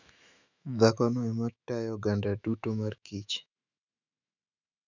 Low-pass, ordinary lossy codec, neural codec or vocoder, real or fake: 7.2 kHz; none; autoencoder, 48 kHz, 128 numbers a frame, DAC-VAE, trained on Japanese speech; fake